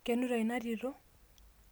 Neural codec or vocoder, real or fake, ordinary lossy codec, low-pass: none; real; none; none